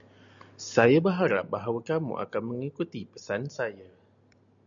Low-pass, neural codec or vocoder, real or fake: 7.2 kHz; none; real